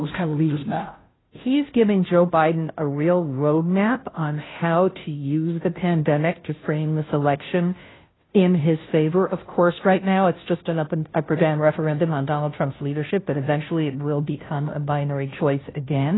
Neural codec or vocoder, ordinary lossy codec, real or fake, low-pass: codec, 16 kHz, 0.5 kbps, FunCodec, trained on Chinese and English, 25 frames a second; AAC, 16 kbps; fake; 7.2 kHz